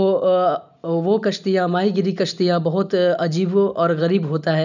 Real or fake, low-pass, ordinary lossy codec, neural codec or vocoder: real; 7.2 kHz; none; none